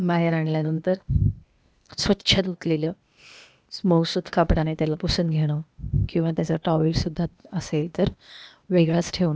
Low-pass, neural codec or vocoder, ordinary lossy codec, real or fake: none; codec, 16 kHz, 0.8 kbps, ZipCodec; none; fake